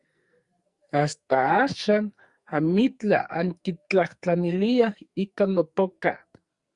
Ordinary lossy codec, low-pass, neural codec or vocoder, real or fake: Opus, 64 kbps; 10.8 kHz; codec, 44.1 kHz, 2.6 kbps, SNAC; fake